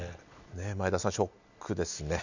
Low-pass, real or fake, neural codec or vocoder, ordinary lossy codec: 7.2 kHz; real; none; none